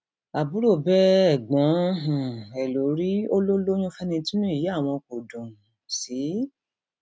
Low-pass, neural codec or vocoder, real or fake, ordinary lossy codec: none; none; real; none